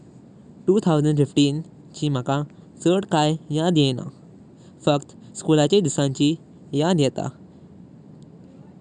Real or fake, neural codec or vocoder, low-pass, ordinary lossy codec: real; none; 10.8 kHz; none